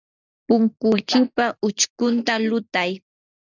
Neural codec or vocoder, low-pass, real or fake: none; 7.2 kHz; real